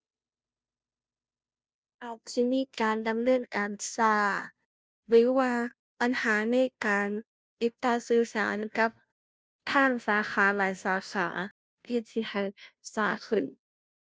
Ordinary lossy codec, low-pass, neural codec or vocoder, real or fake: none; none; codec, 16 kHz, 0.5 kbps, FunCodec, trained on Chinese and English, 25 frames a second; fake